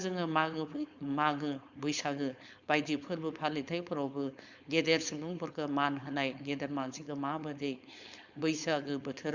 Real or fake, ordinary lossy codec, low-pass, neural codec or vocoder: fake; Opus, 64 kbps; 7.2 kHz; codec, 16 kHz, 4.8 kbps, FACodec